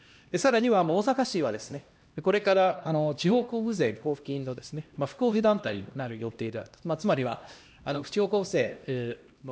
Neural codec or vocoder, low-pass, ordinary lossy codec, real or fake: codec, 16 kHz, 1 kbps, X-Codec, HuBERT features, trained on LibriSpeech; none; none; fake